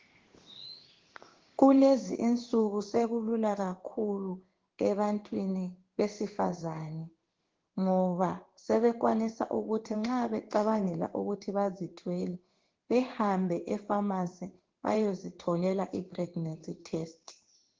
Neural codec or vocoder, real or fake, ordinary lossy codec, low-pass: codec, 16 kHz in and 24 kHz out, 1 kbps, XY-Tokenizer; fake; Opus, 16 kbps; 7.2 kHz